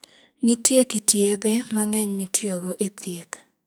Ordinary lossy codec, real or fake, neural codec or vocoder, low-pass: none; fake; codec, 44.1 kHz, 2.6 kbps, SNAC; none